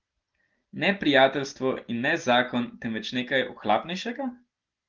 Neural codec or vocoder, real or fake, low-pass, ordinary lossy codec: none; real; 7.2 kHz; Opus, 16 kbps